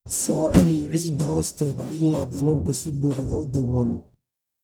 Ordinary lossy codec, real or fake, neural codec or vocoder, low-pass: none; fake; codec, 44.1 kHz, 0.9 kbps, DAC; none